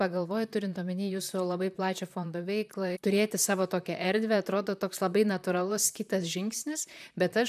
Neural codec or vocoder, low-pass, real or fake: vocoder, 44.1 kHz, 128 mel bands, Pupu-Vocoder; 14.4 kHz; fake